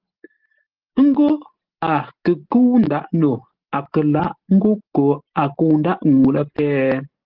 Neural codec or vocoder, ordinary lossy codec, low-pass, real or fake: none; Opus, 16 kbps; 5.4 kHz; real